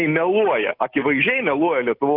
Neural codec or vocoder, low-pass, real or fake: none; 5.4 kHz; real